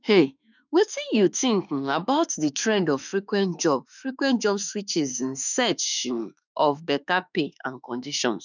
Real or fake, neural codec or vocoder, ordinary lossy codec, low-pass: fake; autoencoder, 48 kHz, 32 numbers a frame, DAC-VAE, trained on Japanese speech; none; 7.2 kHz